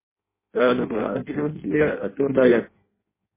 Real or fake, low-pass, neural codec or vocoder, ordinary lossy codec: fake; 3.6 kHz; codec, 16 kHz in and 24 kHz out, 0.6 kbps, FireRedTTS-2 codec; MP3, 16 kbps